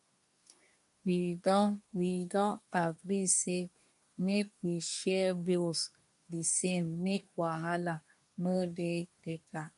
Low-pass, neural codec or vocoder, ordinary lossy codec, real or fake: 10.8 kHz; codec, 24 kHz, 1 kbps, SNAC; MP3, 64 kbps; fake